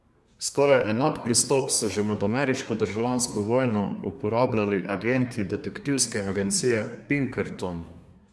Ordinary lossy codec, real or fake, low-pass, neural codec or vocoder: none; fake; none; codec, 24 kHz, 1 kbps, SNAC